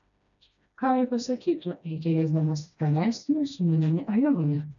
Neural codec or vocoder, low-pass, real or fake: codec, 16 kHz, 1 kbps, FreqCodec, smaller model; 7.2 kHz; fake